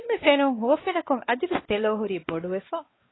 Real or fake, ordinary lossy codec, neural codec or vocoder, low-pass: fake; AAC, 16 kbps; codec, 16 kHz, 4 kbps, X-Codec, WavLM features, trained on Multilingual LibriSpeech; 7.2 kHz